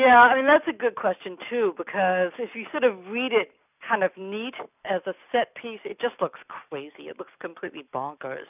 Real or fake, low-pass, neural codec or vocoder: real; 3.6 kHz; none